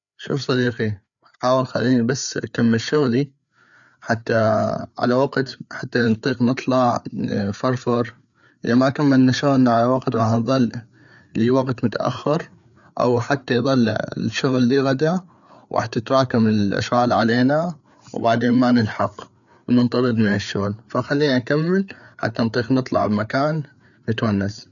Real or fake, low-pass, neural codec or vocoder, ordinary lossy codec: fake; 7.2 kHz; codec, 16 kHz, 4 kbps, FreqCodec, larger model; none